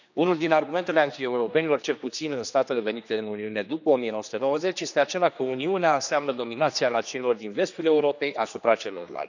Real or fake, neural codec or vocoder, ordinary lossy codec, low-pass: fake; codec, 16 kHz, 2 kbps, X-Codec, HuBERT features, trained on general audio; none; 7.2 kHz